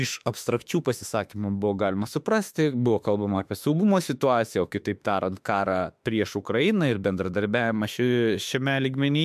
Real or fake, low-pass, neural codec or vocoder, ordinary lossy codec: fake; 14.4 kHz; autoencoder, 48 kHz, 32 numbers a frame, DAC-VAE, trained on Japanese speech; MP3, 96 kbps